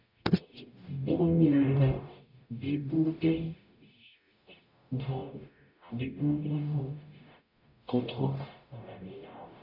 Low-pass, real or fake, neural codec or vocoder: 5.4 kHz; fake; codec, 44.1 kHz, 0.9 kbps, DAC